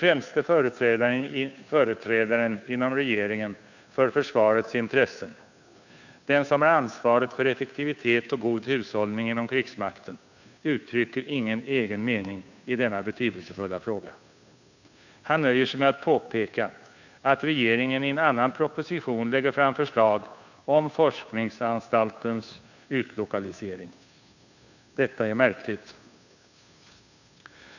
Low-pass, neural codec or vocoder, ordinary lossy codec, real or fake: 7.2 kHz; codec, 16 kHz, 2 kbps, FunCodec, trained on Chinese and English, 25 frames a second; none; fake